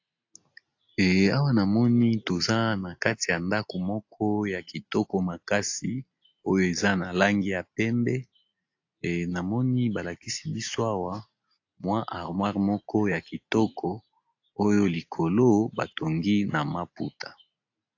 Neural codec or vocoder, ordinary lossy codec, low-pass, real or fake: none; AAC, 48 kbps; 7.2 kHz; real